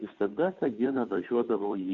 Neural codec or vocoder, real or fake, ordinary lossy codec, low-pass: codec, 16 kHz, 2 kbps, FunCodec, trained on Chinese and English, 25 frames a second; fake; AAC, 64 kbps; 7.2 kHz